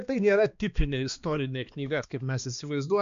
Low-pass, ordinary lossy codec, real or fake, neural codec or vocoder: 7.2 kHz; MP3, 64 kbps; fake; codec, 16 kHz, 2 kbps, X-Codec, HuBERT features, trained on balanced general audio